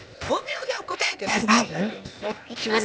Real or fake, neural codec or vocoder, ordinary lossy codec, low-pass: fake; codec, 16 kHz, 0.8 kbps, ZipCodec; none; none